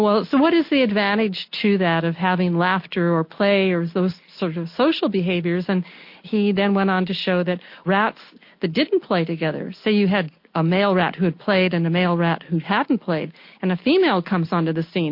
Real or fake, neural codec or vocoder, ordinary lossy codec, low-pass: real; none; MP3, 32 kbps; 5.4 kHz